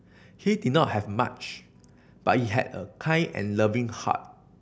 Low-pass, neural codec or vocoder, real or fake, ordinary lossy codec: none; none; real; none